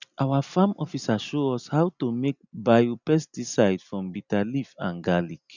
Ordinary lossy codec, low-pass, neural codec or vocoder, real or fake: none; 7.2 kHz; none; real